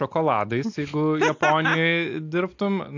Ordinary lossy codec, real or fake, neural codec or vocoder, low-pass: AAC, 48 kbps; real; none; 7.2 kHz